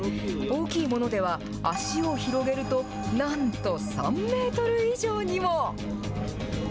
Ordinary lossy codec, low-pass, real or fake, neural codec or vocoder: none; none; real; none